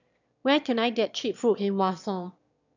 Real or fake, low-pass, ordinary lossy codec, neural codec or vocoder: fake; 7.2 kHz; none; autoencoder, 22.05 kHz, a latent of 192 numbers a frame, VITS, trained on one speaker